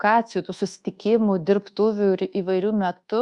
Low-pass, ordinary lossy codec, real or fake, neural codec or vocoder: 10.8 kHz; Opus, 64 kbps; fake; codec, 24 kHz, 1.2 kbps, DualCodec